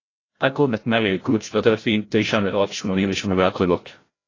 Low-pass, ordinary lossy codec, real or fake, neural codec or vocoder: 7.2 kHz; AAC, 32 kbps; fake; codec, 16 kHz, 0.5 kbps, FreqCodec, larger model